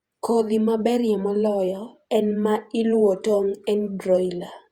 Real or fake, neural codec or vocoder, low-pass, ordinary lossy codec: fake; vocoder, 48 kHz, 128 mel bands, Vocos; 19.8 kHz; Opus, 64 kbps